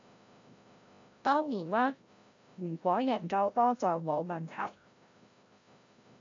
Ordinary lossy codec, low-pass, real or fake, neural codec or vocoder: AAC, 48 kbps; 7.2 kHz; fake; codec, 16 kHz, 0.5 kbps, FreqCodec, larger model